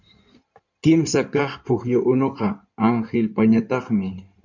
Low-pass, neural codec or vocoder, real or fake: 7.2 kHz; codec, 16 kHz in and 24 kHz out, 2.2 kbps, FireRedTTS-2 codec; fake